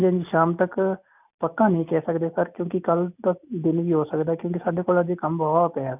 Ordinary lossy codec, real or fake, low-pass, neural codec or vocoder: none; real; 3.6 kHz; none